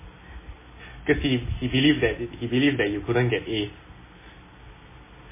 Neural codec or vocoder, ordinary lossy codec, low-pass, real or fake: none; MP3, 16 kbps; 3.6 kHz; real